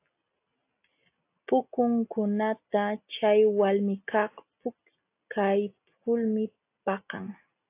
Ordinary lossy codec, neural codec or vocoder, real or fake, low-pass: AAC, 24 kbps; none; real; 3.6 kHz